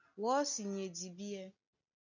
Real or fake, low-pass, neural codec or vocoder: real; 7.2 kHz; none